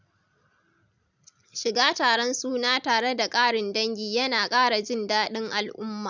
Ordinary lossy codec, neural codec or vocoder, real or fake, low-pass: none; none; real; 7.2 kHz